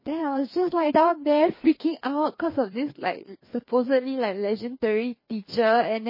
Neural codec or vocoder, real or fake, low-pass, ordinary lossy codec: codec, 16 kHz, 2 kbps, FreqCodec, larger model; fake; 5.4 kHz; MP3, 24 kbps